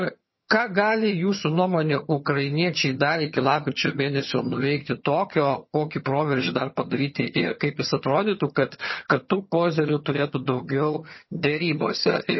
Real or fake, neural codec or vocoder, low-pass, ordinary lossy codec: fake; vocoder, 22.05 kHz, 80 mel bands, HiFi-GAN; 7.2 kHz; MP3, 24 kbps